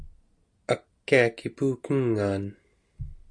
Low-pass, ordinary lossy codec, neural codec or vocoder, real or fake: 9.9 kHz; Opus, 64 kbps; none; real